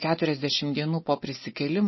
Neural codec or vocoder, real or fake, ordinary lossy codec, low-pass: none; real; MP3, 24 kbps; 7.2 kHz